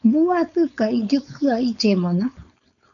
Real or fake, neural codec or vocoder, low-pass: fake; codec, 16 kHz, 4.8 kbps, FACodec; 7.2 kHz